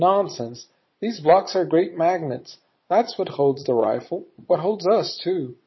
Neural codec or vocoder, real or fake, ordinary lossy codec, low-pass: vocoder, 22.05 kHz, 80 mel bands, Vocos; fake; MP3, 24 kbps; 7.2 kHz